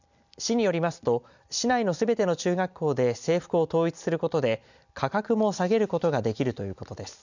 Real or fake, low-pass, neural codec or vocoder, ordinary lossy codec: real; 7.2 kHz; none; none